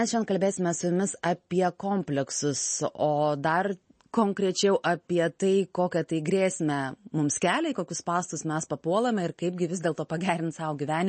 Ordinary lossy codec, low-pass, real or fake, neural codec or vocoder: MP3, 32 kbps; 10.8 kHz; real; none